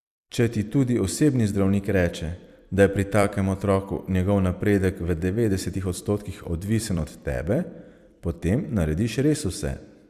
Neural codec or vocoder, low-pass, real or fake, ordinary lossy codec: vocoder, 44.1 kHz, 128 mel bands every 256 samples, BigVGAN v2; 14.4 kHz; fake; Opus, 64 kbps